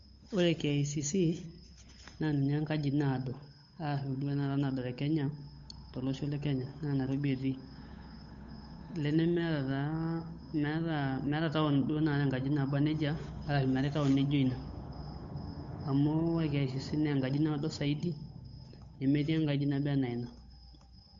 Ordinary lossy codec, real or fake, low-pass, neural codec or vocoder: MP3, 48 kbps; fake; 7.2 kHz; codec, 16 kHz, 8 kbps, FunCodec, trained on Chinese and English, 25 frames a second